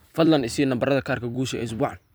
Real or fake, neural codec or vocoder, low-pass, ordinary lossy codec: fake; vocoder, 44.1 kHz, 128 mel bands every 256 samples, BigVGAN v2; none; none